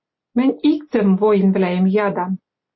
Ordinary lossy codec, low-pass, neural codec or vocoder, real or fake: MP3, 24 kbps; 7.2 kHz; none; real